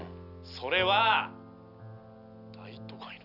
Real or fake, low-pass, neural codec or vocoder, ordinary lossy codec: real; 5.4 kHz; none; none